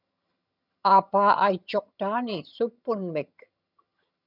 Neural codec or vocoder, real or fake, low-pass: vocoder, 22.05 kHz, 80 mel bands, HiFi-GAN; fake; 5.4 kHz